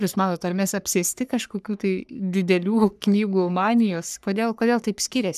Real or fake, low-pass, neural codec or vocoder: fake; 14.4 kHz; codec, 44.1 kHz, 3.4 kbps, Pupu-Codec